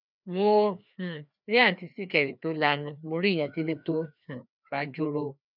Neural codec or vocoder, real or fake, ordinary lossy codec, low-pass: codec, 16 kHz, 2 kbps, FreqCodec, larger model; fake; none; 5.4 kHz